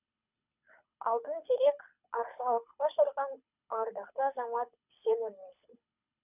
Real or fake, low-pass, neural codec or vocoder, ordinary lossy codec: fake; 3.6 kHz; codec, 24 kHz, 6 kbps, HILCodec; none